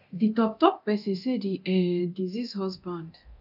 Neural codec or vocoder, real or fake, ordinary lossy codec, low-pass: codec, 24 kHz, 0.9 kbps, DualCodec; fake; none; 5.4 kHz